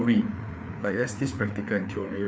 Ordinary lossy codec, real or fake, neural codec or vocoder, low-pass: none; fake; codec, 16 kHz, 4 kbps, FreqCodec, larger model; none